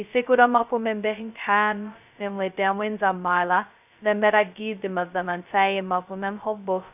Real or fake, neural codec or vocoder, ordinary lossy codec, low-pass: fake; codec, 16 kHz, 0.2 kbps, FocalCodec; none; 3.6 kHz